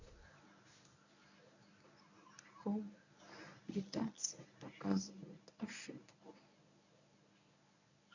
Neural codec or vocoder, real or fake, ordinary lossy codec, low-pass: codec, 24 kHz, 0.9 kbps, WavTokenizer, medium speech release version 1; fake; none; 7.2 kHz